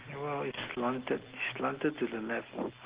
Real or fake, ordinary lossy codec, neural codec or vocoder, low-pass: real; Opus, 16 kbps; none; 3.6 kHz